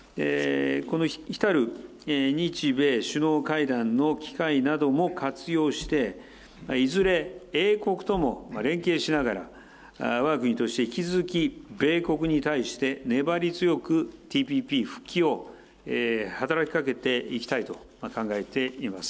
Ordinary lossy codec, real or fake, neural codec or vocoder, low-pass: none; real; none; none